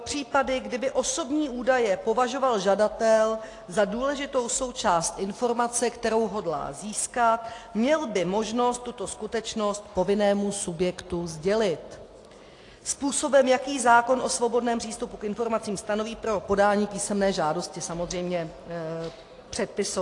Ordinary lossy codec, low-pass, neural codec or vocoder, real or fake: AAC, 48 kbps; 10.8 kHz; none; real